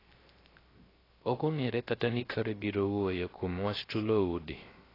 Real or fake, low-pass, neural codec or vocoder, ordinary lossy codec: fake; 5.4 kHz; codec, 16 kHz, 0.3 kbps, FocalCodec; AAC, 24 kbps